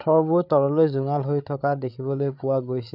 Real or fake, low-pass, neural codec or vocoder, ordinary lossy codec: fake; 5.4 kHz; codec, 16 kHz, 8 kbps, FreqCodec, larger model; none